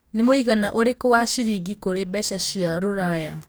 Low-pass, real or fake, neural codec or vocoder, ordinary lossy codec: none; fake; codec, 44.1 kHz, 2.6 kbps, DAC; none